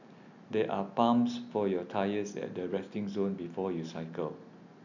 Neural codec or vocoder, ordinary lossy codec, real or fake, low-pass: none; none; real; 7.2 kHz